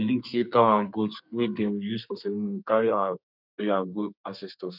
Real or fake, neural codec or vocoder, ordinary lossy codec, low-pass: fake; codec, 32 kHz, 1.9 kbps, SNAC; none; 5.4 kHz